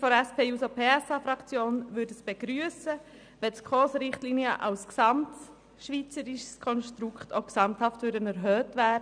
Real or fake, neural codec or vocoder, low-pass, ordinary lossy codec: real; none; 9.9 kHz; none